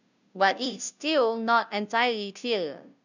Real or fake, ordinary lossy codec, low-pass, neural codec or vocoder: fake; none; 7.2 kHz; codec, 16 kHz, 0.5 kbps, FunCodec, trained on Chinese and English, 25 frames a second